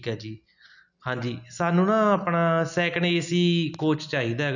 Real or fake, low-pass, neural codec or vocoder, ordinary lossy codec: real; 7.2 kHz; none; none